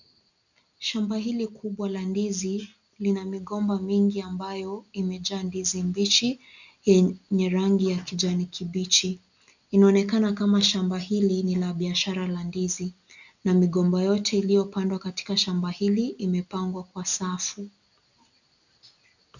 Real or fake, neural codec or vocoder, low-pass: real; none; 7.2 kHz